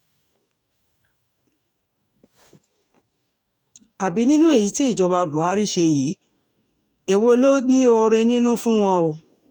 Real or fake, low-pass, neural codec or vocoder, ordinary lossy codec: fake; 19.8 kHz; codec, 44.1 kHz, 2.6 kbps, DAC; none